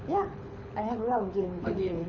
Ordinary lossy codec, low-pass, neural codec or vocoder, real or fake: none; 7.2 kHz; codec, 24 kHz, 6 kbps, HILCodec; fake